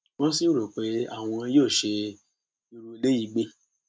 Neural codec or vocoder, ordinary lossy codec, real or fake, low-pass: none; none; real; none